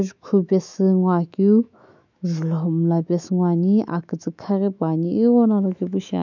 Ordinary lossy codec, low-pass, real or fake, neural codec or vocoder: none; 7.2 kHz; fake; autoencoder, 48 kHz, 128 numbers a frame, DAC-VAE, trained on Japanese speech